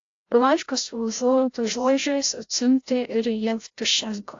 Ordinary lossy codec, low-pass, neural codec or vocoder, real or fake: AAC, 48 kbps; 7.2 kHz; codec, 16 kHz, 0.5 kbps, FreqCodec, larger model; fake